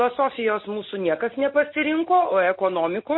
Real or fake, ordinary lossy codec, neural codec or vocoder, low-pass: real; MP3, 24 kbps; none; 7.2 kHz